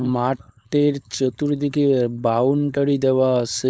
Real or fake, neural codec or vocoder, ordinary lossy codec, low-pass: fake; codec, 16 kHz, 4.8 kbps, FACodec; none; none